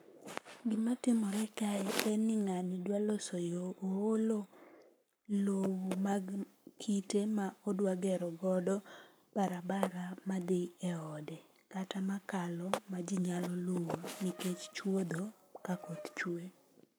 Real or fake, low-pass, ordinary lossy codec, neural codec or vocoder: fake; none; none; codec, 44.1 kHz, 7.8 kbps, Pupu-Codec